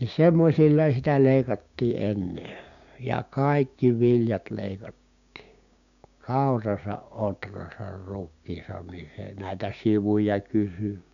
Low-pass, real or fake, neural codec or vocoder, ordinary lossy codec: 7.2 kHz; fake; codec, 16 kHz, 6 kbps, DAC; none